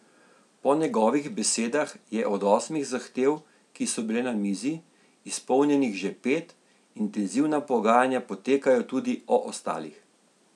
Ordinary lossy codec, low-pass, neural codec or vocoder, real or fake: none; none; none; real